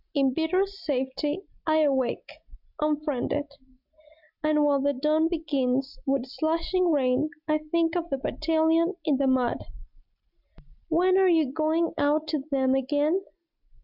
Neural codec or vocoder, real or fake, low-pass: none; real; 5.4 kHz